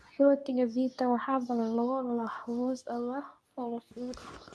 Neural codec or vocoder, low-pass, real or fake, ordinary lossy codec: codec, 24 kHz, 0.9 kbps, WavTokenizer, medium speech release version 2; none; fake; none